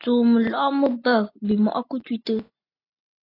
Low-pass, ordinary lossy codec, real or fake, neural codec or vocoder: 5.4 kHz; AAC, 24 kbps; real; none